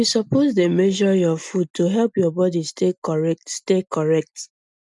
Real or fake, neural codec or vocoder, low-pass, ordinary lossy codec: real; none; 10.8 kHz; none